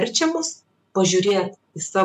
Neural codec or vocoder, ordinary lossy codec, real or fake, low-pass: none; AAC, 96 kbps; real; 14.4 kHz